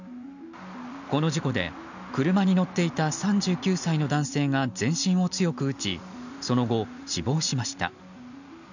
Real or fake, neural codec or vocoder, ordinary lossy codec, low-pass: real; none; none; 7.2 kHz